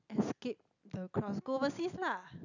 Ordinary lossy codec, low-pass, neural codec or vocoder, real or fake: none; 7.2 kHz; none; real